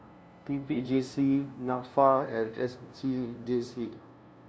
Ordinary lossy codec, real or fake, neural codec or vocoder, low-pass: none; fake; codec, 16 kHz, 0.5 kbps, FunCodec, trained on LibriTTS, 25 frames a second; none